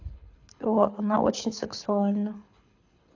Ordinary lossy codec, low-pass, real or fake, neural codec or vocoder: AAC, 48 kbps; 7.2 kHz; fake; codec, 24 kHz, 6 kbps, HILCodec